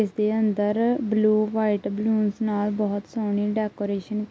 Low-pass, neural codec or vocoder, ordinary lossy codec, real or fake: none; none; none; real